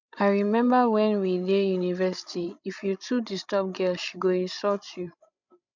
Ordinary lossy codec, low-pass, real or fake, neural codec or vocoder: none; 7.2 kHz; fake; codec, 16 kHz, 16 kbps, FreqCodec, larger model